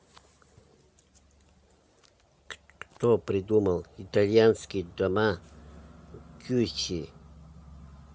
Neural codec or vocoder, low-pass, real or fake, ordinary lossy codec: none; none; real; none